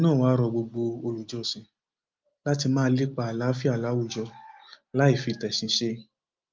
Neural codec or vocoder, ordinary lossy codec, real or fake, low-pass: none; Opus, 32 kbps; real; 7.2 kHz